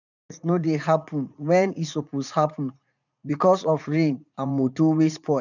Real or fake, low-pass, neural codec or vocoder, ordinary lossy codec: fake; 7.2 kHz; vocoder, 44.1 kHz, 80 mel bands, Vocos; none